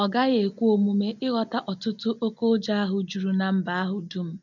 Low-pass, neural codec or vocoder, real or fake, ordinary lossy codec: 7.2 kHz; none; real; none